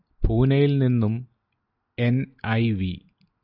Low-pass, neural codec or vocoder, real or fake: 5.4 kHz; none; real